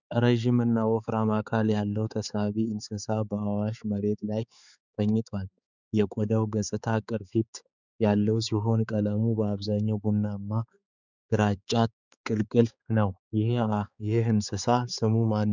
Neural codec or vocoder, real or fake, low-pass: codec, 16 kHz, 4 kbps, X-Codec, HuBERT features, trained on balanced general audio; fake; 7.2 kHz